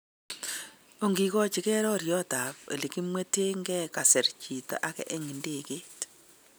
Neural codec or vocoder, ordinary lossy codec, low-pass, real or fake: none; none; none; real